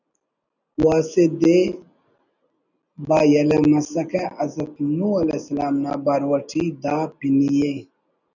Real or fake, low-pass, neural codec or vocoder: real; 7.2 kHz; none